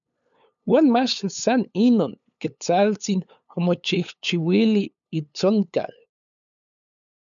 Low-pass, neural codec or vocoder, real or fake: 7.2 kHz; codec, 16 kHz, 8 kbps, FunCodec, trained on LibriTTS, 25 frames a second; fake